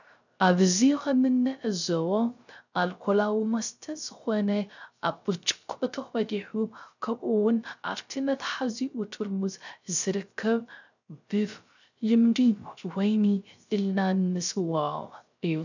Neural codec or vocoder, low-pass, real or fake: codec, 16 kHz, 0.3 kbps, FocalCodec; 7.2 kHz; fake